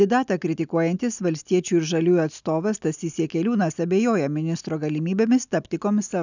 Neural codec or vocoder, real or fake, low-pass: none; real; 7.2 kHz